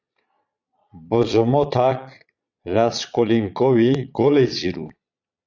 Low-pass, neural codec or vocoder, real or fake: 7.2 kHz; vocoder, 22.05 kHz, 80 mel bands, Vocos; fake